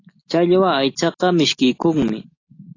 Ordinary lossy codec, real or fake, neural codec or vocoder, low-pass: AAC, 32 kbps; real; none; 7.2 kHz